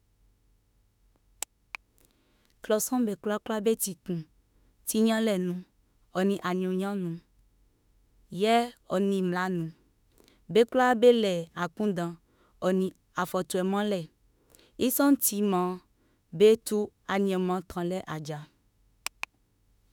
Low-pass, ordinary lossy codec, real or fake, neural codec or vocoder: 19.8 kHz; none; fake; autoencoder, 48 kHz, 32 numbers a frame, DAC-VAE, trained on Japanese speech